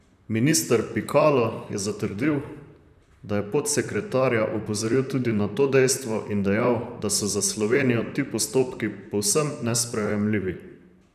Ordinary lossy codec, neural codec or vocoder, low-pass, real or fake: none; vocoder, 44.1 kHz, 128 mel bands, Pupu-Vocoder; 14.4 kHz; fake